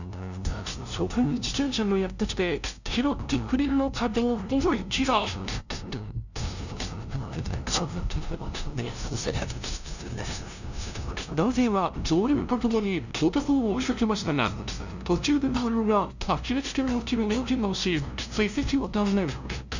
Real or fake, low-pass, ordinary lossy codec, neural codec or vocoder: fake; 7.2 kHz; none; codec, 16 kHz, 0.5 kbps, FunCodec, trained on LibriTTS, 25 frames a second